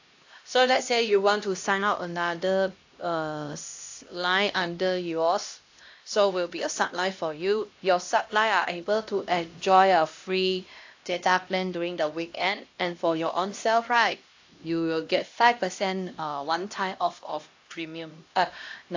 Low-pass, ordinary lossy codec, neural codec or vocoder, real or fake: 7.2 kHz; AAC, 48 kbps; codec, 16 kHz, 1 kbps, X-Codec, HuBERT features, trained on LibriSpeech; fake